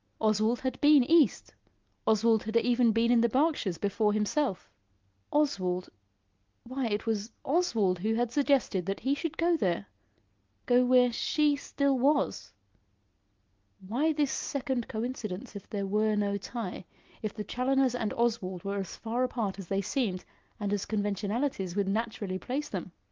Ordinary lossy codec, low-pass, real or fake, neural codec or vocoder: Opus, 32 kbps; 7.2 kHz; real; none